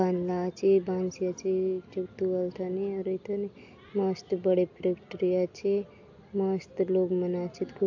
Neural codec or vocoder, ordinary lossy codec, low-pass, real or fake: none; AAC, 48 kbps; 7.2 kHz; real